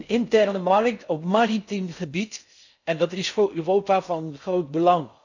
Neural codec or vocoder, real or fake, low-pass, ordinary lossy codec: codec, 16 kHz in and 24 kHz out, 0.6 kbps, FocalCodec, streaming, 4096 codes; fake; 7.2 kHz; none